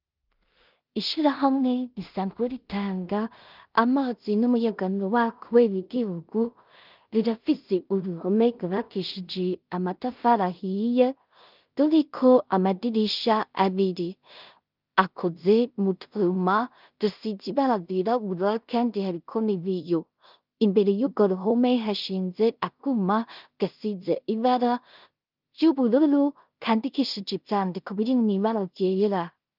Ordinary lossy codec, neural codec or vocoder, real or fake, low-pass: Opus, 32 kbps; codec, 16 kHz in and 24 kHz out, 0.4 kbps, LongCat-Audio-Codec, two codebook decoder; fake; 5.4 kHz